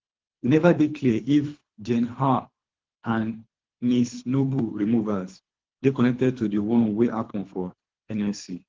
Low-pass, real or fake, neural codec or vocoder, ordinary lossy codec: 7.2 kHz; fake; codec, 24 kHz, 3 kbps, HILCodec; Opus, 16 kbps